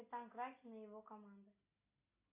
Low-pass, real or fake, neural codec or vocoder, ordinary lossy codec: 3.6 kHz; real; none; AAC, 32 kbps